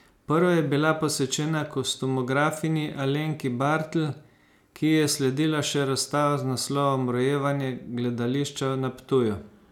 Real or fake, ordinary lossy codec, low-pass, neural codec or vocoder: real; none; 19.8 kHz; none